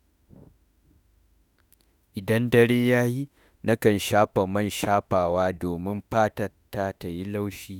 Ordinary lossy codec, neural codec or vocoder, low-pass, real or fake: none; autoencoder, 48 kHz, 32 numbers a frame, DAC-VAE, trained on Japanese speech; none; fake